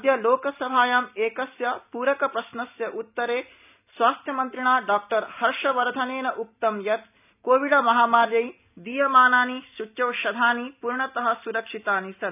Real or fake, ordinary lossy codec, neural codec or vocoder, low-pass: real; none; none; 3.6 kHz